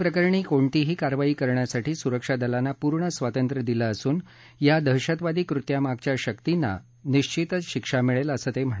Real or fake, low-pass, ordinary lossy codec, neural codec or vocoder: real; none; none; none